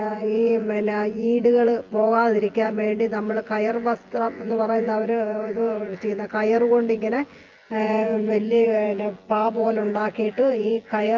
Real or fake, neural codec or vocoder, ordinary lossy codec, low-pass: fake; vocoder, 24 kHz, 100 mel bands, Vocos; Opus, 24 kbps; 7.2 kHz